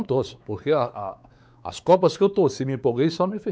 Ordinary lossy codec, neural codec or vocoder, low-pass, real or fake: none; codec, 16 kHz, 4 kbps, X-Codec, WavLM features, trained on Multilingual LibriSpeech; none; fake